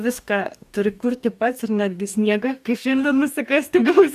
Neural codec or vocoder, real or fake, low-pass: codec, 44.1 kHz, 2.6 kbps, DAC; fake; 14.4 kHz